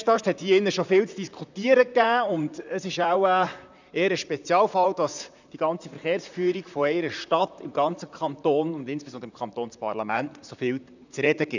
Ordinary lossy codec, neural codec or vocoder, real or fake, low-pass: none; vocoder, 44.1 kHz, 128 mel bands, Pupu-Vocoder; fake; 7.2 kHz